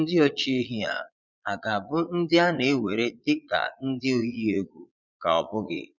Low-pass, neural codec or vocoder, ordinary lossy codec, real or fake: 7.2 kHz; vocoder, 22.05 kHz, 80 mel bands, Vocos; none; fake